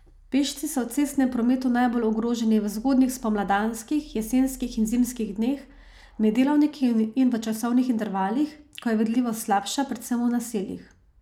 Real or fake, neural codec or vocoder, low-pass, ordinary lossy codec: real; none; 19.8 kHz; none